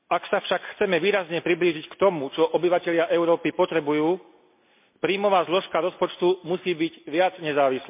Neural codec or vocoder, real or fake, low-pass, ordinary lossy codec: none; real; 3.6 kHz; MP3, 32 kbps